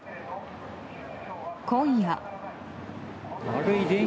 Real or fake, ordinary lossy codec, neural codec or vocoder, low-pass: real; none; none; none